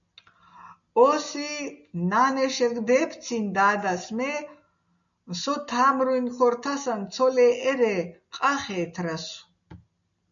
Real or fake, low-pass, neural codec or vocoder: real; 7.2 kHz; none